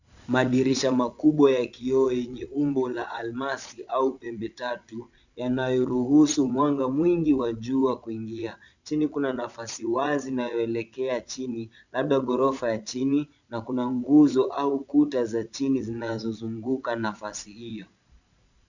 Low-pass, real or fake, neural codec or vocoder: 7.2 kHz; fake; vocoder, 22.05 kHz, 80 mel bands, Vocos